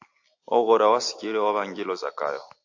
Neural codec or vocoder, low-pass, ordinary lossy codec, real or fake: none; 7.2 kHz; AAC, 48 kbps; real